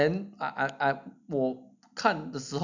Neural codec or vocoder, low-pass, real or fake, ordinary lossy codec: none; 7.2 kHz; real; none